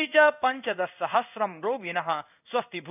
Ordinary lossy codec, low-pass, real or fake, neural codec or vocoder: none; 3.6 kHz; fake; codec, 16 kHz in and 24 kHz out, 1 kbps, XY-Tokenizer